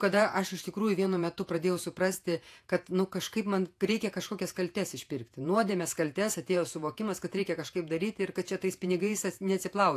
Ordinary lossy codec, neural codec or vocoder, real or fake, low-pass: AAC, 64 kbps; vocoder, 48 kHz, 128 mel bands, Vocos; fake; 14.4 kHz